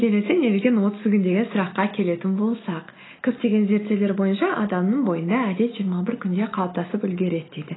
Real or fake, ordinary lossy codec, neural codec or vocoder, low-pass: real; AAC, 16 kbps; none; 7.2 kHz